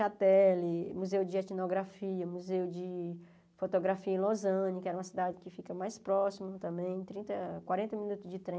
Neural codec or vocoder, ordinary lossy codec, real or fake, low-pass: none; none; real; none